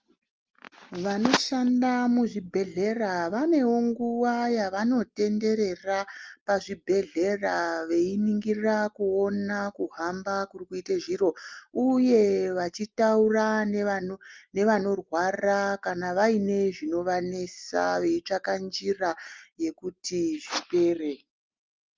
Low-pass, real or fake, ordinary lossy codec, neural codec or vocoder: 7.2 kHz; real; Opus, 24 kbps; none